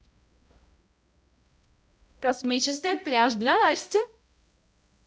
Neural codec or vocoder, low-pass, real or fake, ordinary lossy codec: codec, 16 kHz, 0.5 kbps, X-Codec, HuBERT features, trained on balanced general audio; none; fake; none